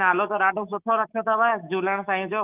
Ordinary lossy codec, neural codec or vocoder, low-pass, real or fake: Opus, 32 kbps; autoencoder, 48 kHz, 128 numbers a frame, DAC-VAE, trained on Japanese speech; 3.6 kHz; fake